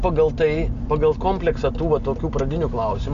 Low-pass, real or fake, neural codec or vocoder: 7.2 kHz; real; none